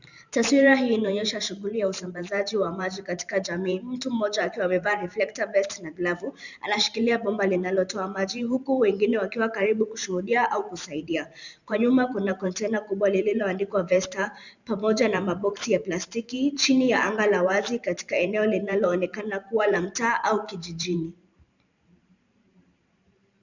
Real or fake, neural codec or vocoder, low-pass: fake; vocoder, 44.1 kHz, 128 mel bands every 512 samples, BigVGAN v2; 7.2 kHz